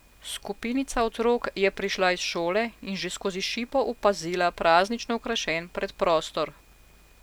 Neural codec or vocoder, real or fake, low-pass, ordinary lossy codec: none; real; none; none